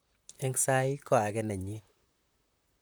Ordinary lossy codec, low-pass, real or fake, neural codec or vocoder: none; none; fake; vocoder, 44.1 kHz, 128 mel bands, Pupu-Vocoder